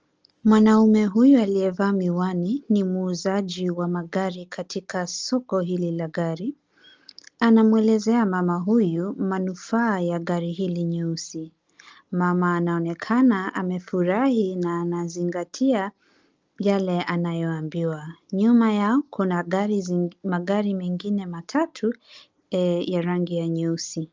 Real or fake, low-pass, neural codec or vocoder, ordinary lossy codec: real; 7.2 kHz; none; Opus, 24 kbps